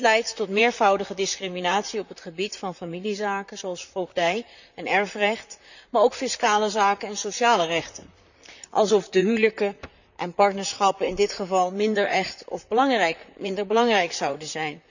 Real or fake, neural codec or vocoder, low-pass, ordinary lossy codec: fake; vocoder, 44.1 kHz, 128 mel bands, Pupu-Vocoder; 7.2 kHz; none